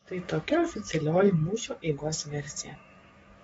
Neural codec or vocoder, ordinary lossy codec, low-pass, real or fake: codec, 44.1 kHz, 7.8 kbps, DAC; AAC, 24 kbps; 19.8 kHz; fake